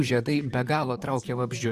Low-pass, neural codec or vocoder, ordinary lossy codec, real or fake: 14.4 kHz; vocoder, 44.1 kHz, 128 mel bands, Pupu-Vocoder; Opus, 64 kbps; fake